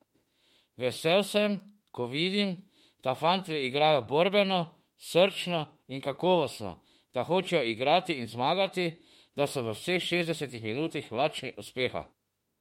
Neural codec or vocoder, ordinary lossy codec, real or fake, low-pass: autoencoder, 48 kHz, 32 numbers a frame, DAC-VAE, trained on Japanese speech; MP3, 64 kbps; fake; 19.8 kHz